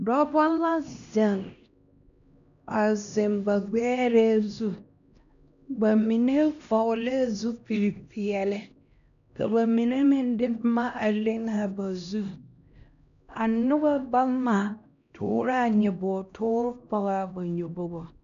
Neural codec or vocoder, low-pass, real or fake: codec, 16 kHz, 1 kbps, X-Codec, HuBERT features, trained on LibriSpeech; 7.2 kHz; fake